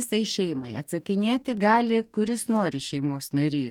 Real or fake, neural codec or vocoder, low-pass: fake; codec, 44.1 kHz, 2.6 kbps, DAC; 19.8 kHz